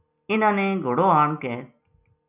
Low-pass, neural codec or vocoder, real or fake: 3.6 kHz; none; real